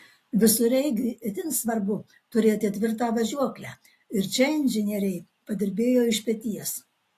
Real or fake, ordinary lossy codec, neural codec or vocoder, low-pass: real; AAC, 48 kbps; none; 14.4 kHz